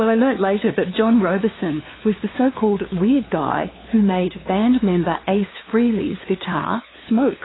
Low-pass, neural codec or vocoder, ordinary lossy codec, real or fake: 7.2 kHz; codec, 16 kHz, 2 kbps, FunCodec, trained on LibriTTS, 25 frames a second; AAC, 16 kbps; fake